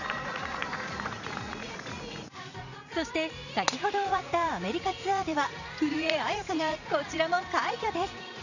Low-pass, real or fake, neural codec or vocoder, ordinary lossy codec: 7.2 kHz; fake; vocoder, 44.1 kHz, 80 mel bands, Vocos; none